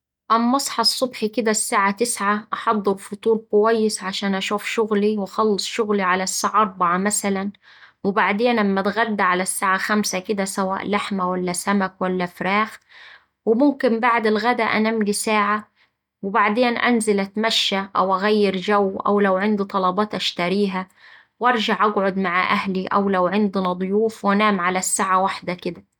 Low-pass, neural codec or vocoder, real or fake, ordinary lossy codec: 19.8 kHz; none; real; none